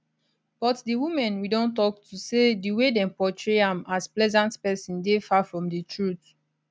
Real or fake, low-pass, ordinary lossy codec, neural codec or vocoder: real; none; none; none